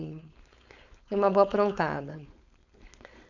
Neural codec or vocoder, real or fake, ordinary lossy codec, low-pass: codec, 16 kHz, 4.8 kbps, FACodec; fake; none; 7.2 kHz